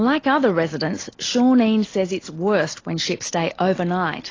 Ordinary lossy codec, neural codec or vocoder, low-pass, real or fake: AAC, 32 kbps; none; 7.2 kHz; real